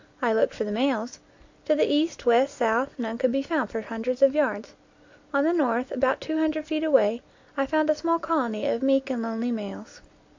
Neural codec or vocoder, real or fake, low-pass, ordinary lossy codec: none; real; 7.2 kHz; AAC, 48 kbps